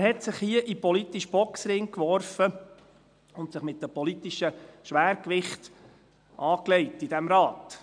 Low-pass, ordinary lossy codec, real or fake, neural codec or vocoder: 9.9 kHz; none; real; none